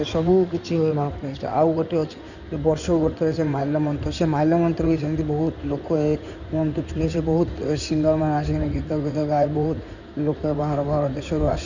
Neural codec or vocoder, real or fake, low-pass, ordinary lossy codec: codec, 16 kHz in and 24 kHz out, 2.2 kbps, FireRedTTS-2 codec; fake; 7.2 kHz; none